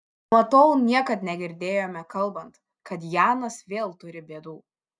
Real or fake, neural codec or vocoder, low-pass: real; none; 9.9 kHz